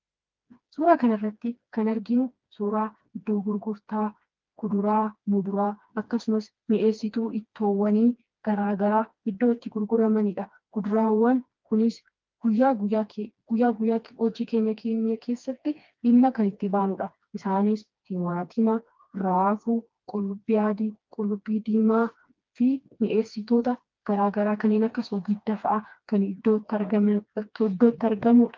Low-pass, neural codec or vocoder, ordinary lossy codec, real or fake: 7.2 kHz; codec, 16 kHz, 2 kbps, FreqCodec, smaller model; Opus, 24 kbps; fake